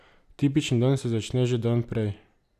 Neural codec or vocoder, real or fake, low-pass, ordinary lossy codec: none; real; 14.4 kHz; none